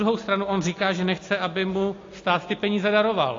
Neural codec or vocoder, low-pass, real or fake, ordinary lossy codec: none; 7.2 kHz; real; AAC, 32 kbps